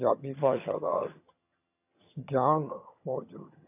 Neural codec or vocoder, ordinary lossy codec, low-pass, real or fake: vocoder, 22.05 kHz, 80 mel bands, HiFi-GAN; AAC, 32 kbps; 3.6 kHz; fake